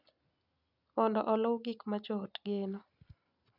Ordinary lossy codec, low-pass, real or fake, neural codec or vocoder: none; 5.4 kHz; real; none